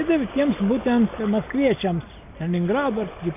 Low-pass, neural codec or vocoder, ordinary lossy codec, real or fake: 3.6 kHz; none; AAC, 32 kbps; real